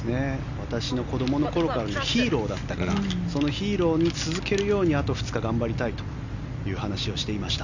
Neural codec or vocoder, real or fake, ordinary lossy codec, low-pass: none; real; none; 7.2 kHz